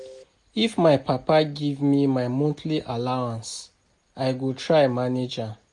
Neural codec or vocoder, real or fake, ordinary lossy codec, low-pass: none; real; MP3, 48 kbps; 10.8 kHz